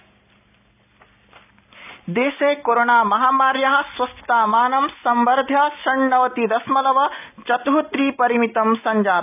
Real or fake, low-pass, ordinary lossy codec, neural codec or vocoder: real; 3.6 kHz; none; none